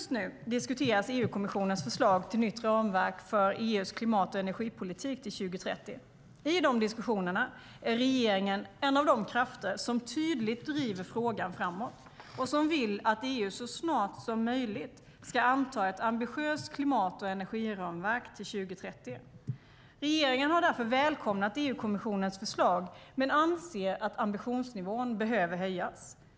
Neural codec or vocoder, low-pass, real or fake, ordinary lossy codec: none; none; real; none